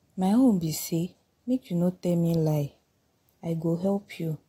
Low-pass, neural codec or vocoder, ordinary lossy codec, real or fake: 19.8 kHz; none; AAC, 48 kbps; real